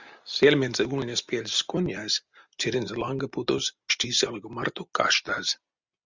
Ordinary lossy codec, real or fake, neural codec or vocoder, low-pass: Opus, 64 kbps; real; none; 7.2 kHz